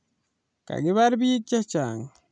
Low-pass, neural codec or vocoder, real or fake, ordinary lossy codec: 9.9 kHz; none; real; none